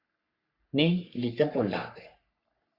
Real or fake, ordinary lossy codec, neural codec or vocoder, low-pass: fake; Opus, 64 kbps; codec, 44.1 kHz, 3.4 kbps, Pupu-Codec; 5.4 kHz